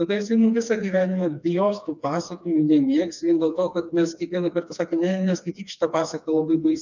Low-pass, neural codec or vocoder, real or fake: 7.2 kHz; codec, 16 kHz, 2 kbps, FreqCodec, smaller model; fake